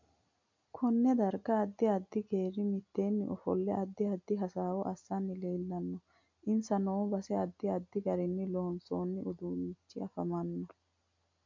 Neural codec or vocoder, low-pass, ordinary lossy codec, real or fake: none; 7.2 kHz; MP3, 64 kbps; real